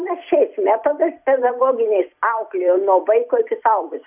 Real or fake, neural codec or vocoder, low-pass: fake; vocoder, 44.1 kHz, 128 mel bands every 256 samples, BigVGAN v2; 3.6 kHz